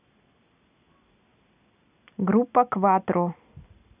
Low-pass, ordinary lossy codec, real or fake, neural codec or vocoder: 3.6 kHz; none; real; none